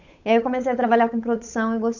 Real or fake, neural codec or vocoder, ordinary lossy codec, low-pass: fake; codec, 16 kHz, 8 kbps, FunCodec, trained on Chinese and English, 25 frames a second; none; 7.2 kHz